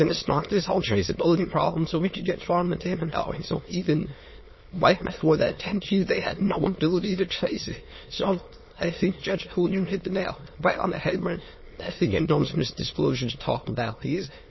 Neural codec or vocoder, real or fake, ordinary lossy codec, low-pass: autoencoder, 22.05 kHz, a latent of 192 numbers a frame, VITS, trained on many speakers; fake; MP3, 24 kbps; 7.2 kHz